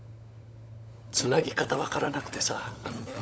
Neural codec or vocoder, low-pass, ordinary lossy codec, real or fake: codec, 16 kHz, 16 kbps, FunCodec, trained on LibriTTS, 50 frames a second; none; none; fake